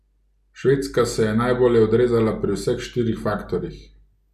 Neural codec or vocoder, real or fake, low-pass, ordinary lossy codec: none; real; 14.4 kHz; none